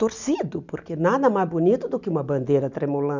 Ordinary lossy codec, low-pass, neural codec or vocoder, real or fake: none; 7.2 kHz; none; real